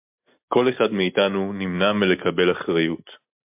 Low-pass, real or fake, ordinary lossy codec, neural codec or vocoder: 3.6 kHz; real; MP3, 32 kbps; none